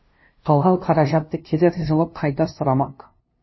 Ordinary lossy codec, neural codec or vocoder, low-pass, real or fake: MP3, 24 kbps; codec, 16 kHz, 0.5 kbps, FunCodec, trained on LibriTTS, 25 frames a second; 7.2 kHz; fake